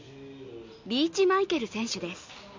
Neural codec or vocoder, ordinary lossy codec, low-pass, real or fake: none; MP3, 64 kbps; 7.2 kHz; real